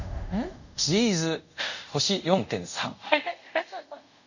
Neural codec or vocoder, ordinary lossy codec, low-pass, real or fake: codec, 24 kHz, 0.5 kbps, DualCodec; none; 7.2 kHz; fake